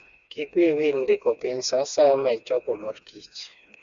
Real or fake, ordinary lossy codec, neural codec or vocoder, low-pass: fake; Opus, 64 kbps; codec, 16 kHz, 2 kbps, FreqCodec, smaller model; 7.2 kHz